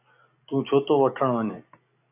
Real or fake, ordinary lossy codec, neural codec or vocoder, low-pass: real; AAC, 24 kbps; none; 3.6 kHz